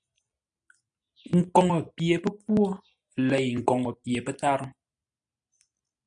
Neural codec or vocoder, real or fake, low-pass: none; real; 9.9 kHz